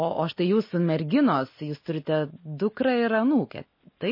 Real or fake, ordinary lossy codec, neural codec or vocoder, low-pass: real; MP3, 24 kbps; none; 5.4 kHz